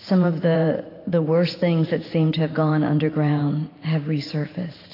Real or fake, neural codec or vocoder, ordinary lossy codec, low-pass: fake; vocoder, 44.1 kHz, 128 mel bands every 512 samples, BigVGAN v2; AAC, 24 kbps; 5.4 kHz